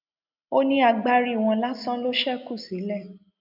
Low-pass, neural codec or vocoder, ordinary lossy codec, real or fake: 5.4 kHz; none; none; real